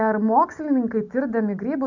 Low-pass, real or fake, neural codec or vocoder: 7.2 kHz; real; none